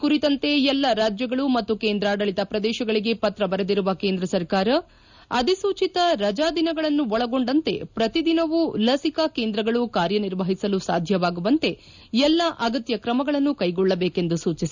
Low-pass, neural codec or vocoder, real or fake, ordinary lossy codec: 7.2 kHz; none; real; none